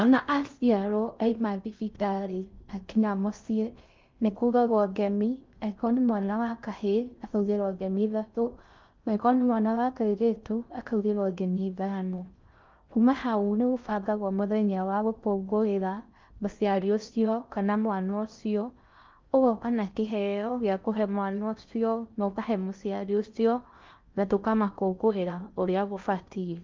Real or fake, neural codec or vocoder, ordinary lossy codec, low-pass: fake; codec, 16 kHz in and 24 kHz out, 0.6 kbps, FocalCodec, streaming, 4096 codes; Opus, 24 kbps; 7.2 kHz